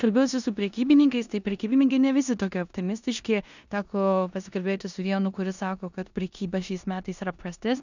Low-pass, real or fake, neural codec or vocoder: 7.2 kHz; fake; codec, 16 kHz in and 24 kHz out, 0.9 kbps, LongCat-Audio-Codec, four codebook decoder